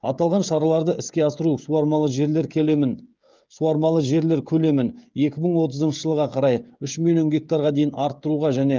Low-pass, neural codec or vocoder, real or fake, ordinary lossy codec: 7.2 kHz; codec, 16 kHz, 16 kbps, FreqCodec, smaller model; fake; Opus, 24 kbps